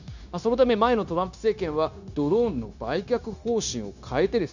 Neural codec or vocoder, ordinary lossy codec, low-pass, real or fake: codec, 16 kHz, 0.9 kbps, LongCat-Audio-Codec; none; 7.2 kHz; fake